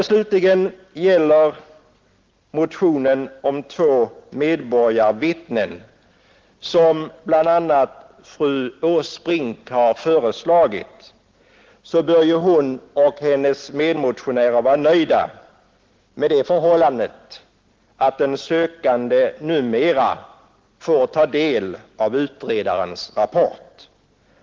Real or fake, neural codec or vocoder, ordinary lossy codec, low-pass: real; none; Opus, 16 kbps; 7.2 kHz